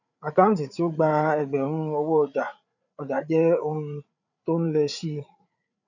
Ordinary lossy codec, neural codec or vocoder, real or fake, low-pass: none; codec, 16 kHz, 8 kbps, FreqCodec, larger model; fake; 7.2 kHz